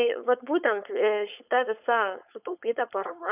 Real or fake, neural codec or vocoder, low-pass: fake; codec, 16 kHz, 4.8 kbps, FACodec; 3.6 kHz